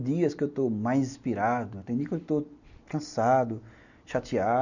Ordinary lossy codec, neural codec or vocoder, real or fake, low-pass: none; none; real; 7.2 kHz